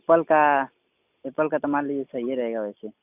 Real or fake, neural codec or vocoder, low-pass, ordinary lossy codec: real; none; 3.6 kHz; none